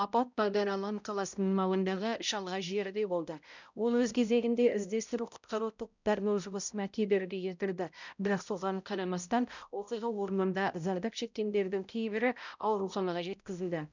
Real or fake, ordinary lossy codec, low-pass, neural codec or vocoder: fake; none; 7.2 kHz; codec, 16 kHz, 0.5 kbps, X-Codec, HuBERT features, trained on balanced general audio